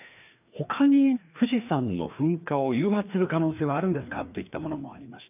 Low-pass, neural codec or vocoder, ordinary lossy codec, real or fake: 3.6 kHz; codec, 16 kHz, 2 kbps, FreqCodec, larger model; none; fake